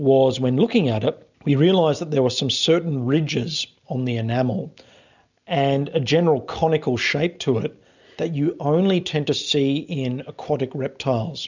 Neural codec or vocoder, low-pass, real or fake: none; 7.2 kHz; real